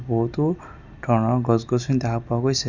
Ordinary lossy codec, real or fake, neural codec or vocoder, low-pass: none; real; none; 7.2 kHz